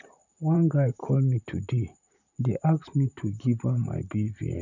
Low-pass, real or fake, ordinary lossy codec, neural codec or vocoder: 7.2 kHz; fake; none; vocoder, 44.1 kHz, 128 mel bands, Pupu-Vocoder